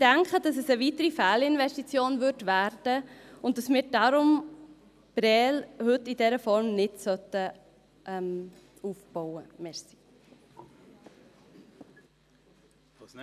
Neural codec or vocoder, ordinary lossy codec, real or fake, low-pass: none; none; real; 14.4 kHz